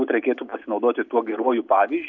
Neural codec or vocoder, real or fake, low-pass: none; real; 7.2 kHz